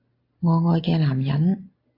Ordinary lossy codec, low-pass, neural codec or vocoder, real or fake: AAC, 32 kbps; 5.4 kHz; none; real